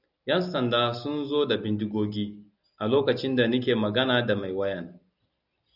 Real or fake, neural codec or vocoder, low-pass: real; none; 5.4 kHz